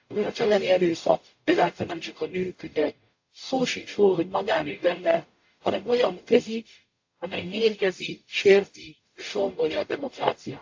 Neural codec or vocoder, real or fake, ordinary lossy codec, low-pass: codec, 44.1 kHz, 0.9 kbps, DAC; fake; AAC, 48 kbps; 7.2 kHz